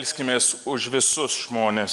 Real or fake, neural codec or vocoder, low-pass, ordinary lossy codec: real; none; 10.8 kHz; Opus, 32 kbps